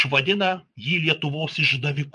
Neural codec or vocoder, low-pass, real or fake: vocoder, 22.05 kHz, 80 mel bands, Vocos; 9.9 kHz; fake